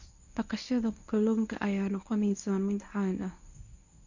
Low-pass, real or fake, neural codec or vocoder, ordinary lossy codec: 7.2 kHz; fake; codec, 24 kHz, 0.9 kbps, WavTokenizer, medium speech release version 1; none